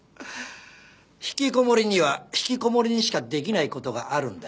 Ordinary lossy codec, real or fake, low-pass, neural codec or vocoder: none; real; none; none